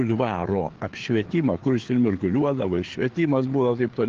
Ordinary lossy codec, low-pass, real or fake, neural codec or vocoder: Opus, 16 kbps; 7.2 kHz; fake; codec, 16 kHz, 4 kbps, FreqCodec, larger model